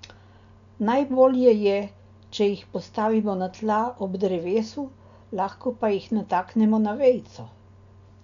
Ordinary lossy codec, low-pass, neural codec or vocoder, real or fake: none; 7.2 kHz; none; real